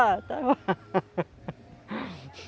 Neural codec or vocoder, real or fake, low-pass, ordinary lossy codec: none; real; none; none